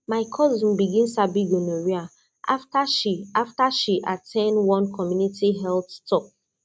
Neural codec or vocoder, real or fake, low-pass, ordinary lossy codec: none; real; none; none